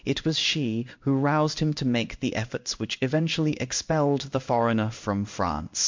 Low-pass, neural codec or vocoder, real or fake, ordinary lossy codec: 7.2 kHz; codec, 16 kHz in and 24 kHz out, 1 kbps, XY-Tokenizer; fake; MP3, 64 kbps